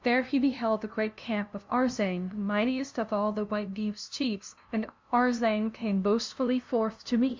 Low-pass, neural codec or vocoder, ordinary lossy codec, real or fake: 7.2 kHz; codec, 16 kHz, 0.5 kbps, FunCodec, trained on LibriTTS, 25 frames a second; MP3, 64 kbps; fake